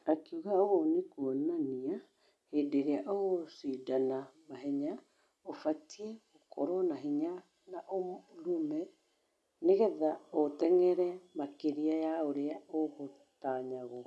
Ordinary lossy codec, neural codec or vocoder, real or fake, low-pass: none; none; real; none